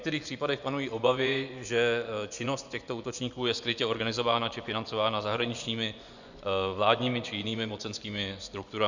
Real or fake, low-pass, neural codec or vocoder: fake; 7.2 kHz; vocoder, 24 kHz, 100 mel bands, Vocos